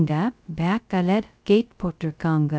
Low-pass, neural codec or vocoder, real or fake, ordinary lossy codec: none; codec, 16 kHz, 0.2 kbps, FocalCodec; fake; none